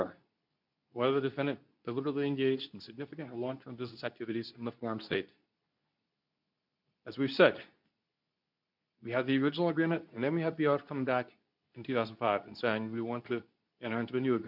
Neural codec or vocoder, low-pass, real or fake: codec, 24 kHz, 0.9 kbps, WavTokenizer, medium speech release version 2; 5.4 kHz; fake